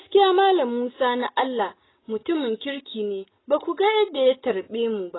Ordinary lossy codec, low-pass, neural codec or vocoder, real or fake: AAC, 16 kbps; 7.2 kHz; none; real